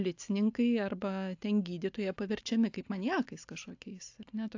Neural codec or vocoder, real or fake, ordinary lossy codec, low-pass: none; real; AAC, 48 kbps; 7.2 kHz